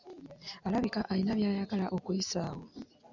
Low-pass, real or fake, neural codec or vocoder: 7.2 kHz; real; none